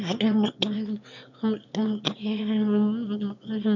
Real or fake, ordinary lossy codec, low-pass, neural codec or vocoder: fake; none; 7.2 kHz; autoencoder, 22.05 kHz, a latent of 192 numbers a frame, VITS, trained on one speaker